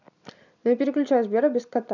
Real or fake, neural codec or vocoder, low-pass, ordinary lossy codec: real; none; 7.2 kHz; none